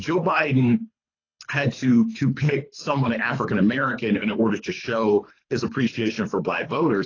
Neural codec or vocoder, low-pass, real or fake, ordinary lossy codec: codec, 24 kHz, 3 kbps, HILCodec; 7.2 kHz; fake; AAC, 32 kbps